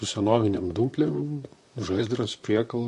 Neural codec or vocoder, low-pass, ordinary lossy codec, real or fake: vocoder, 44.1 kHz, 128 mel bands, Pupu-Vocoder; 14.4 kHz; MP3, 48 kbps; fake